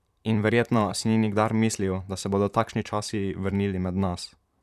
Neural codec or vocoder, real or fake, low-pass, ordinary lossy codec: vocoder, 44.1 kHz, 128 mel bands, Pupu-Vocoder; fake; 14.4 kHz; none